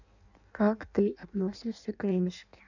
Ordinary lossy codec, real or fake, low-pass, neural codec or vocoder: none; fake; 7.2 kHz; codec, 16 kHz in and 24 kHz out, 0.6 kbps, FireRedTTS-2 codec